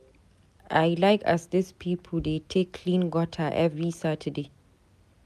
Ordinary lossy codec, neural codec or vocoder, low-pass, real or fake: none; none; 14.4 kHz; real